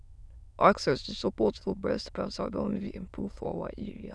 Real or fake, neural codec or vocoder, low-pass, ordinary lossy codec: fake; autoencoder, 22.05 kHz, a latent of 192 numbers a frame, VITS, trained on many speakers; none; none